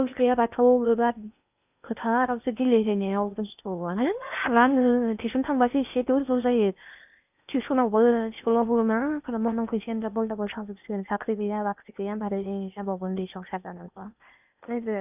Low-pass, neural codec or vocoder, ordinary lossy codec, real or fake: 3.6 kHz; codec, 16 kHz in and 24 kHz out, 0.8 kbps, FocalCodec, streaming, 65536 codes; none; fake